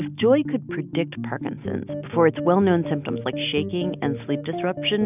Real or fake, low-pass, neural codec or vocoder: real; 3.6 kHz; none